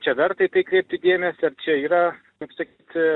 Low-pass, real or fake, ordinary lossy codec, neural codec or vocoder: 9.9 kHz; real; Opus, 64 kbps; none